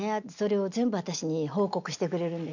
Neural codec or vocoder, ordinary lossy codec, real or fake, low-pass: none; none; real; 7.2 kHz